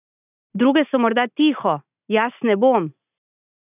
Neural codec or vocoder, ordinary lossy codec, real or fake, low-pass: none; none; real; 3.6 kHz